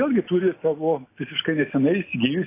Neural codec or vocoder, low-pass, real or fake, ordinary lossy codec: none; 3.6 kHz; real; AAC, 24 kbps